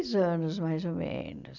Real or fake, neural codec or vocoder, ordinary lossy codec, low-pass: real; none; none; 7.2 kHz